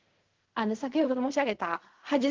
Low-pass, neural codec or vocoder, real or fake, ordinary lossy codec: 7.2 kHz; codec, 16 kHz in and 24 kHz out, 0.4 kbps, LongCat-Audio-Codec, fine tuned four codebook decoder; fake; Opus, 16 kbps